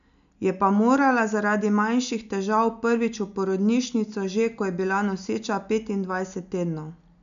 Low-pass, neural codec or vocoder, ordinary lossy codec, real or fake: 7.2 kHz; none; none; real